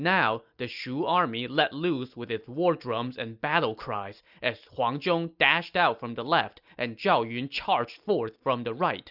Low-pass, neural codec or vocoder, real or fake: 5.4 kHz; none; real